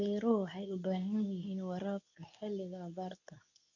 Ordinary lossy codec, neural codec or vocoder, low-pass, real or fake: none; codec, 24 kHz, 0.9 kbps, WavTokenizer, medium speech release version 2; 7.2 kHz; fake